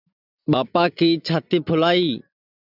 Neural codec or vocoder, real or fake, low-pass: none; real; 5.4 kHz